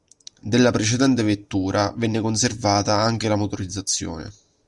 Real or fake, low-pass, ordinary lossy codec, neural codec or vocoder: real; 10.8 kHz; Opus, 64 kbps; none